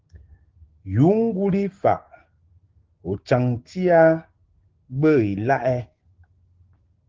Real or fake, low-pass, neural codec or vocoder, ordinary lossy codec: fake; 7.2 kHz; codec, 16 kHz, 6 kbps, DAC; Opus, 16 kbps